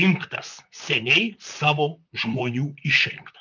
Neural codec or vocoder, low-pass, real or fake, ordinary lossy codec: vocoder, 44.1 kHz, 128 mel bands, Pupu-Vocoder; 7.2 kHz; fake; MP3, 64 kbps